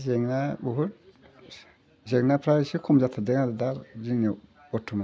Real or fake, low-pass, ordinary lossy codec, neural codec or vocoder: real; none; none; none